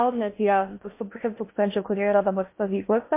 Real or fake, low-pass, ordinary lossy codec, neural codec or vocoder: fake; 3.6 kHz; MP3, 24 kbps; codec, 16 kHz in and 24 kHz out, 0.6 kbps, FocalCodec, streaming, 2048 codes